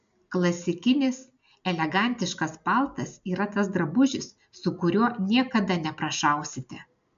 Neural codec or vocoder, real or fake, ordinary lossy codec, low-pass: none; real; AAC, 96 kbps; 7.2 kHz